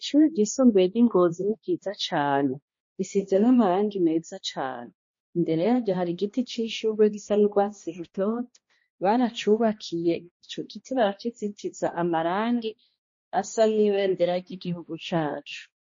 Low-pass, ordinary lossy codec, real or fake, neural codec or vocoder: 7.2 kHz; MP3, 32 kbps; fake; codec, 16 kHz, 1 kbps, X-Codec, HuBERT features, trained on balanced general audio